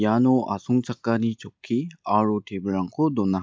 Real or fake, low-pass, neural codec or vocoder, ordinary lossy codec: real; none; none; none